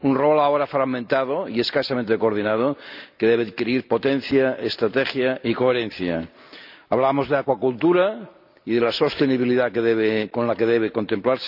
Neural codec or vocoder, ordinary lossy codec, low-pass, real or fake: none; none; 5.4 kHz; real